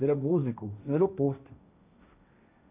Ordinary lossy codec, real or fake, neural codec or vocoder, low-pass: AAC, 32 kbps; fake; codec, 16 kHz, 1.1 kbps, Voila-Tokenizer; 3.6 kHz